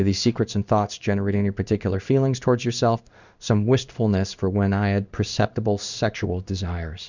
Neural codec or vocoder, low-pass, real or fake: codec, 16 kHz in and 24 kHz out, 1 kbps, XY-Tokenizer; 7.2 kHz; fake